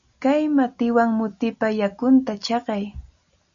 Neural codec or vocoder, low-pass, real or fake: none; 7.2 kHz; real